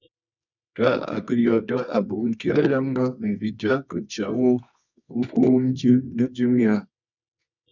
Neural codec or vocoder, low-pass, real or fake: codec, 24 kHz, 0.9 kbps, WavTokenizer, medium music audio release; 7.2 kHz; fake